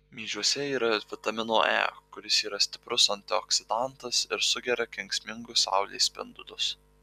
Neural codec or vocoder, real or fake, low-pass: none; real; 14.4 kHz